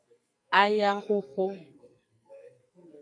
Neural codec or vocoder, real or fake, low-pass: codec, 44.1 kHz, 2.6 kbps, SNAC; fake; 9.9 kHz